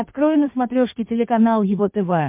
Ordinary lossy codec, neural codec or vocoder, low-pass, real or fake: MP3, 32 kbps; codec, 16 kHz in and 24 kHz out, 1.1 kbps, FireRedTTS-2 codec; 3.6 kHz; fake